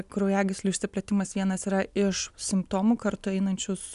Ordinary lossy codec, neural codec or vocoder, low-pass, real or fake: MP3, 96 kbps; none; 10.8 kHz; real